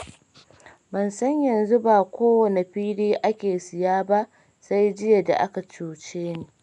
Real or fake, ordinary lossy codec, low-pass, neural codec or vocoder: real; none; 10.8 kHz; none